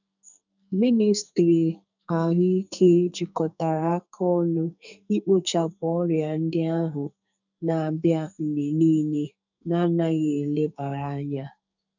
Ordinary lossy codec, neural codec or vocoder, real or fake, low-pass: none; codec, 44.1 kHz, 2.6 kbps, SNAC; fake; 7.2 kHz